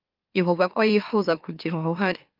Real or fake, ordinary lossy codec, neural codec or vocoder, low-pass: fake; Opus, 32 kbps; autoencoder, 44.1 kHz, a latent of 192 numbers a frame, MeloTTS; 5.4 kHz